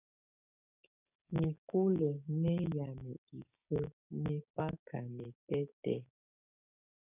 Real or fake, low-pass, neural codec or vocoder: fake; 3.6 kHz; codec, 16 kHz, 6 kbps, DAC